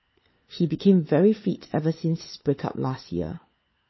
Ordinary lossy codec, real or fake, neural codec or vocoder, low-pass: MP3, 24 kbps; fake; codec, 16 kHz, 4 kbps, FunCodec, trained on LibriTTS, 50 frames a second; 7.2 kHz